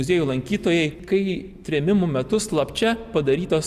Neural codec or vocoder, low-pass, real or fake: none; 14.4 kHz; real